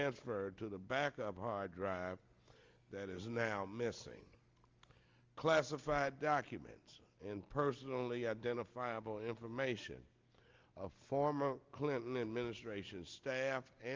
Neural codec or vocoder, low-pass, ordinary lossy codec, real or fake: none; 7.2 kHz; Opus, 32 kbps; real